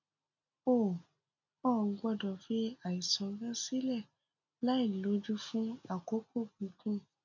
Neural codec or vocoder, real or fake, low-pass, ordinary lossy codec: none; real; 7.2 kHz; none